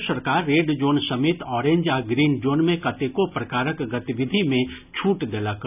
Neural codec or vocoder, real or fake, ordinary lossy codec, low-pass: none; real; none; 3.6 kHz